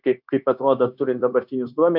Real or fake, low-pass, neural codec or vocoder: fake; 5.4 kHz; codec, 16 kHz, 0.9 kbps, LongCat-Audio-Codec